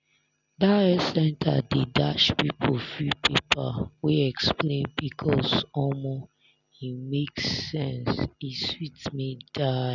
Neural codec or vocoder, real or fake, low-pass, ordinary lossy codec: none; real; 7.2 kHz; none